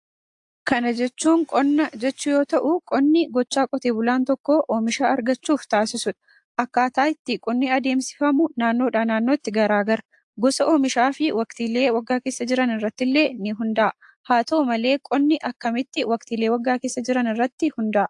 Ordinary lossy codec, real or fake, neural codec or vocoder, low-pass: AAC, 64 kbps; real; none; 10.8 kHz